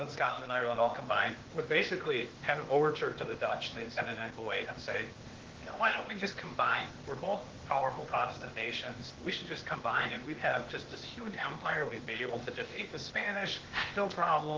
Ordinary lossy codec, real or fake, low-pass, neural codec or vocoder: Opus, 16 kbps; fake; 7.2 kHz; codec, 16 kHz, 0.8 kbps, ZipCodec